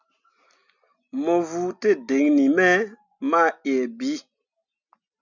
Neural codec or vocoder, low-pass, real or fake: none; 7.2 kHz; real